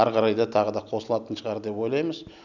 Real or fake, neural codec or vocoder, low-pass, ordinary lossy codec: real; none; 7.2 kHz; none